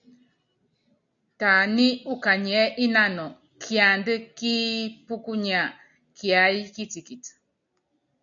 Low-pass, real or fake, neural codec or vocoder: 7.2 kHz; real; none